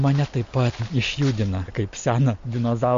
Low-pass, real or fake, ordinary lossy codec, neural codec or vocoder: 7.2 kHz; real; MP3, 48 kbps; none